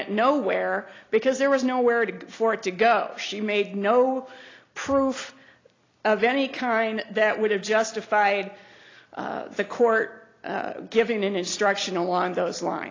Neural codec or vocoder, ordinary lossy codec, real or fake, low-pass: none; AAC, 32 kbps; real; 7.2 kHz